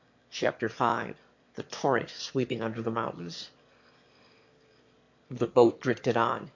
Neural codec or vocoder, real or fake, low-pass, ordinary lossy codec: autoencoder, 22.05 kHz, a latent of 192 numbers a frame, VITS, trained on one speaker; fake; 7.2 kHz; MP3, 48 kbps